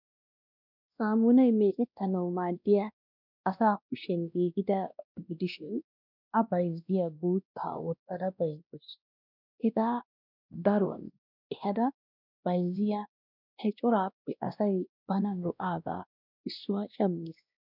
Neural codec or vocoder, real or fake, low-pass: codec, 16 kHz, 1 kbps, X-Codec, WavLM features, trained on Multilingual LibriSpeech; fake; 5.4 kHz